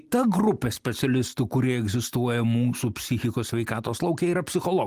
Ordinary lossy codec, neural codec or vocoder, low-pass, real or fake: Opus, 24 kbps; none; 14.4 kHz; real